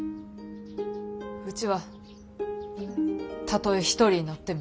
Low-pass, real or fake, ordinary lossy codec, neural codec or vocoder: none; real; none; none